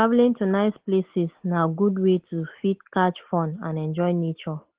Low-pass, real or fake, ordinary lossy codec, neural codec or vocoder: 3.6 kHz; real; Opus, 16 kbps; none